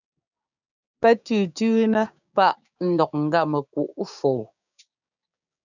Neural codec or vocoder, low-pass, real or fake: codec, 16 kHz, 6 kbps, DAC; 7.2 kHz; fake